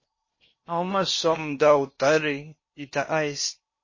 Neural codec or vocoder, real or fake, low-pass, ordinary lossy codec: codec, 16 kHz in and 24 kHz out, 0.8 kbps, FocalCodec, streaming, 65536 codes; fake; 7.2 kHz; MP3, 32 kbps